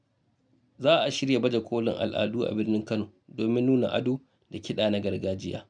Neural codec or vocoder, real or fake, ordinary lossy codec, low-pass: none; real; MP3, 96 kbps; 9.9 kHz